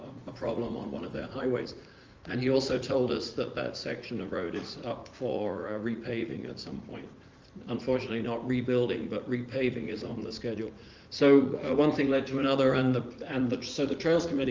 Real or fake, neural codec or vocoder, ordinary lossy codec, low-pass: fake; vocoder, 44.1 kHz, 80 mel bands, Vocos; Opus, 32 kbps; 7.2 kHz